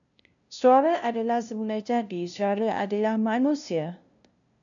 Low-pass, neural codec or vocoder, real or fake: 7.2 kHz; codec, 16 kHz, 0.5 kbps, FunCodec, trained on LibriTTS, 25 frames a second; fake